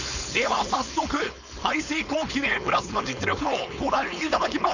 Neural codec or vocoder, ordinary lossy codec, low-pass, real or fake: codec, 16 kHz, 4.8 kbps, FACodec; none; 7.2 kHz; fake